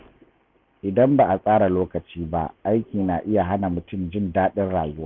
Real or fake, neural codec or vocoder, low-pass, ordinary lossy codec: real; none; 7.2 kHz; none